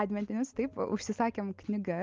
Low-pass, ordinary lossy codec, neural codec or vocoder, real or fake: 7.2 kHz; Opus, 32 kbps; none; real